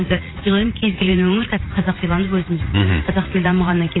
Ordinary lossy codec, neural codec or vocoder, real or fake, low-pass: AAC, 16 kbps; vocoder, 44.1 kHz, 80 mel bands, Vocos; fake; 7.2 kHz